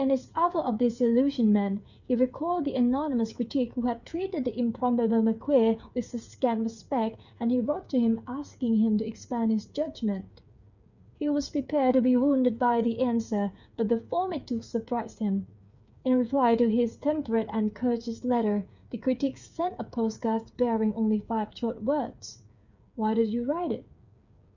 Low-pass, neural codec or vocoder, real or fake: 7.2 kHz; codec, 16 kHz, 8 kbps, FreqCodec, smaller model; fake